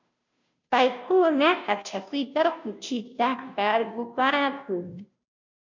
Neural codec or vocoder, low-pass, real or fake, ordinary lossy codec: codec, 16 kHz, 0.5 kbps, FunCodec, trained on Chinese and English, 25 frames a second; 7.2 kHz; fake; MP3, 64 kbps